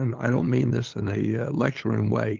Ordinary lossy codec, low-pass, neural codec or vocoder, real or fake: Opus, 32 kbps; 7.2 kHz; codec, 16 kHz, 8 kbps, FunCodec, trained on LibriTTS, 25 frames a second; fake